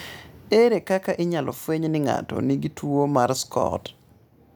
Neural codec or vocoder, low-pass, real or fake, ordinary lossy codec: none; none; real; none